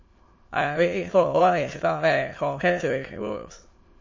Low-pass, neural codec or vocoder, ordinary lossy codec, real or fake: 7.2 kHz; autoencoder, 22.05 kHz, a latent of 192 numbers a frame, VITS, trained on many speakers; MP3, 32 kbps; fake